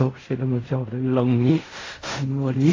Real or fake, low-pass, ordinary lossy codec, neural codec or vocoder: fake; 7.2 kHz; AAC, 32 kbps; codec, 16 kHz in and 24 kHz out, 0.4 kbps, LongCat-Audio-Codec, fine tuned four codebook decoder